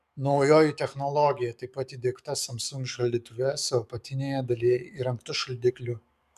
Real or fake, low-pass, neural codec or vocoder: fake; 14.4 kHz; codec, 44.1 kHz, 7.8 kbps, DAC